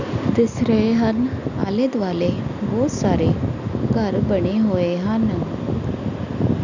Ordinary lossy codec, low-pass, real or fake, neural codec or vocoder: none; 7.2 kHz; real; none